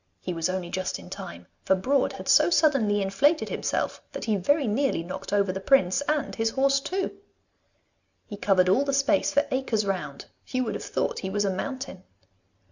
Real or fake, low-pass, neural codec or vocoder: real; 7.2 kHz; none